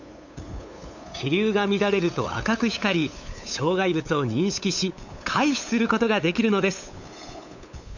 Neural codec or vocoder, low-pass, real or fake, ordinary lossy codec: codec, 16 kHz, 8 kbps, FunCodec, trained on LibriTTS, 25 frames a second; 7.2 kHz; fake; none